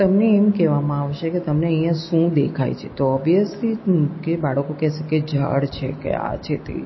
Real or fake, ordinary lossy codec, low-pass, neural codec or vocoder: real; MP3, 24 kbps; 7.2 kHz; none